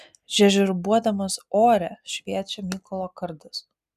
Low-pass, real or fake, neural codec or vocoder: 14.4 kHz; real; none